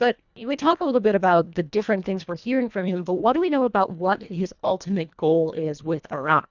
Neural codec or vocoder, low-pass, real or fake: codec, 24 kHz, 1.5 kbps, HILCodec; 7.2 kHz; fake